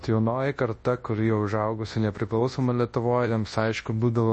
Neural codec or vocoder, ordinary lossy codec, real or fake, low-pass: codec, 24 kHz, 0.9 kbps, WavTokenizer, large speech release; MP3, 32 kbps; fake; 10.8 kHz